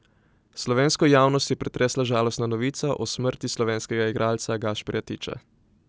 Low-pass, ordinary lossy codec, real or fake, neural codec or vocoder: none; none; real; none